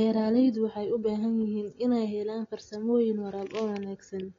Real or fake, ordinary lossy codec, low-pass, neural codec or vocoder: real; AAC, 32 kbps; 7.2 kHz; none